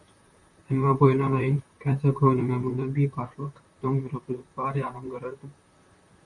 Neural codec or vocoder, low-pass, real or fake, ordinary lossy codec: vocoder, 44.1 kHz, 128 mel bands, Pupu-Vocoder; 10.8 kHz; fake; MP3, 48 kbps